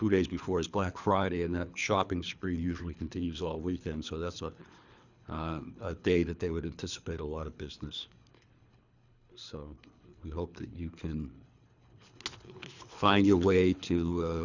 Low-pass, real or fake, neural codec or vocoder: 7.2 kHz; fake; codec, 24 kHz, 3 kbps, HILCodec